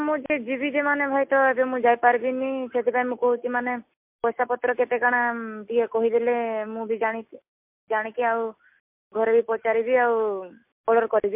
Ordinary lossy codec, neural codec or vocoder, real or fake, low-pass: MP3, 32 kbps; none; real; 3.6 kHz